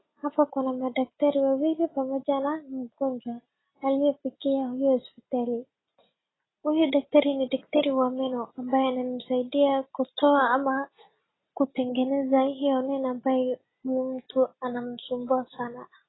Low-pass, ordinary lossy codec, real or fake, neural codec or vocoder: 7.2 kHz; AAC, 16 kbps; real; none